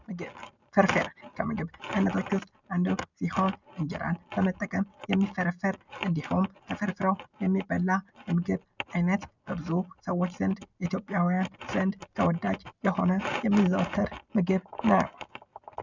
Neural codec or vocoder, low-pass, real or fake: none; 7.2 kHz; real